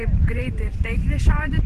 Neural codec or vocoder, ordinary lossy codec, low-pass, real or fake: vocoder, 44.1 kHz, 128 mel bands every 512 samples, BigVGAN v2; Opus, 16 kbps; 14.4 kHz; fake